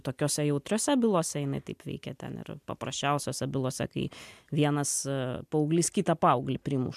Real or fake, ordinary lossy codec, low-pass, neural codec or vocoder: real; MP3, 96 kbps; 14.4 kHz; none